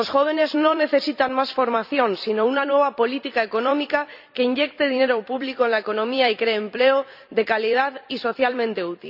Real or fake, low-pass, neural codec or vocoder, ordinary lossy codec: fake; 5.4 kHz; vocoder, 44.1 kHz, 128 mel bands every 256 samples, BigVGAN v2; none